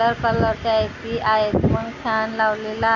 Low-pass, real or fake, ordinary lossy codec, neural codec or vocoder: 7.2 kHz; real; none; none